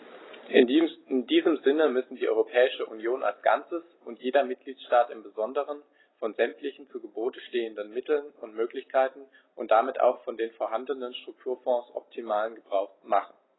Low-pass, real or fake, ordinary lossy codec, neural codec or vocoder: 7.2 kHz; real; AAC, 16 kbps; none